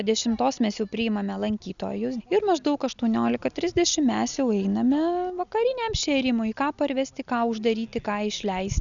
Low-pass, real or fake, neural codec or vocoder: 7.2 kHz; real; none